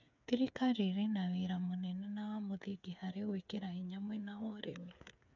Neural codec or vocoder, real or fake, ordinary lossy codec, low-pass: codec, 16 kHz, 8 kbps, FreqCodec, smaller model; fake; none; 7.2 kHz